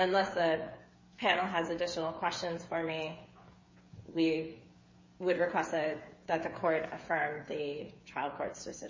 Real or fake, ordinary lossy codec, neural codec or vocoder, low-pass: fake; MP3, 32 kbps; codec, 16 kHz, 8 kbps, FreqCodec, smaller model; 7.2 kHz